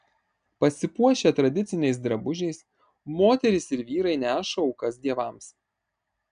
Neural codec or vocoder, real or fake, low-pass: none; real; 9.9 kHz